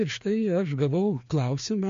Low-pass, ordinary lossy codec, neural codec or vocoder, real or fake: 7.2 kHz; MP3, 48 kbps; codec, 16 kHz, 2 kbps, FreqCodec, larger model; fake